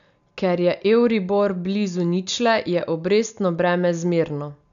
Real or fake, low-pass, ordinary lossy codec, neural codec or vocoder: real; 7.2 kHz; none; none